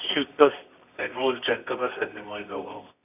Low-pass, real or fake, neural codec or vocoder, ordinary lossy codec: 3.6 kHz; fake; codec, 24 kHz, 0.9 kbps, WavTokenizer, medium music audio release; none